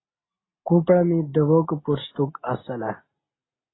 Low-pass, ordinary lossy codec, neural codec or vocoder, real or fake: 7.2 kHz; AAC, 16 kbps; none; real